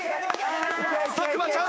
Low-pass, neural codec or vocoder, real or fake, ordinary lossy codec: none; codec, 16 kHz, 6 kbps, DAC; fake; none